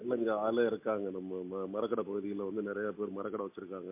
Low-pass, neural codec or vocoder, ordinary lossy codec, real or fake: 3.6 kHz; none; MP3, 24 kbps; real